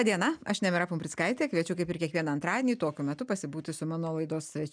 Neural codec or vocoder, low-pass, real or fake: none; 9.9 kHz; real